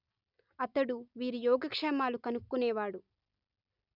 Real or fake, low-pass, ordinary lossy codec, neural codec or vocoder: real; 5.4 kHz; none; none